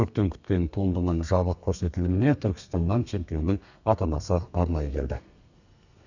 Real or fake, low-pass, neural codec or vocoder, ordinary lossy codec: fake; 7.2 kHz; codec, 32 kHz, 1.9 kbps, SNAC; none